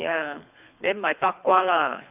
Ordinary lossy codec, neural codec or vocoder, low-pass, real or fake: none; codec, 24 kHz, 3 kbps, HILCodec; 3.6 kHz; fake